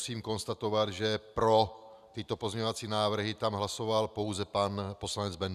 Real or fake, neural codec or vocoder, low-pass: real; none; 14.4 kHz